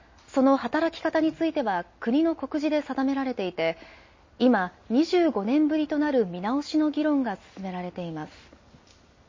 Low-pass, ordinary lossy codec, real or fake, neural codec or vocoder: 7.2 kHz; MP3, 32 kbps; real; none